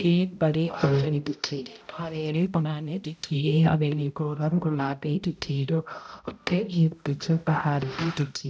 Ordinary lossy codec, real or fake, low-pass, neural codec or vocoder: none; fake; none; codec, 16 kHz, 0.5 kbps, X-Codec, HuBERT features, trained on balanced general audio